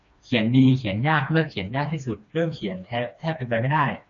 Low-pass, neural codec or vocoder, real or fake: 7.2 kHz; codec, 16 kHz, 2 kbps, FreqCodec, smaller model; fake